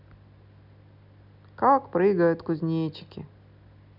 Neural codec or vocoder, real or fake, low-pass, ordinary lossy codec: none; real; 5.4 kHz; none